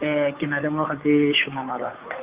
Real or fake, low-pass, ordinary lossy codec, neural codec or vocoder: fake; 3.6 kHz; Opus, 32 kbps; codec, 16 kHz, 2 kbps, FunCodec, trained on Chinese and English, 25 frames a second